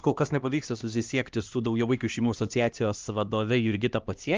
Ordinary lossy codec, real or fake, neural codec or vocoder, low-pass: Opus, 32 kbps; fake; codec, 16 kHz, 1 kbps, X-Codec, HuBERT features, trained on LibriSpeech; 7.2 kHz